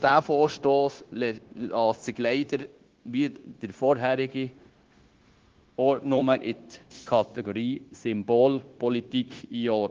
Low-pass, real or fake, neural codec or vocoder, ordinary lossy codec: 7.2 kHz; fake; codec, 16 kHz, 0.9 kbps, LongCat-Audio-Codec; Opus, 16 kbps